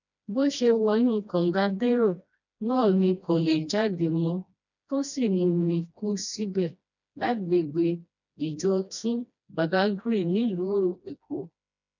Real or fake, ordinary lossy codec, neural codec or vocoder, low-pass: fake; none; codec, 16 kHz, 1 kbps, FreqCodec, smaller model; 7.2 kHz